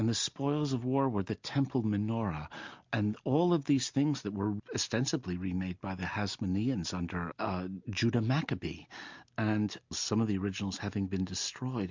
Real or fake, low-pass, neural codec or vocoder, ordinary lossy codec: real; 7.2 kHz; none; MP3, 64 kbps